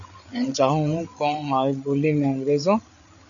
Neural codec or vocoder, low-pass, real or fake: codec, 16 kHz, 8 kbps, FreqCodec, larger model; 7.2 kHz; fake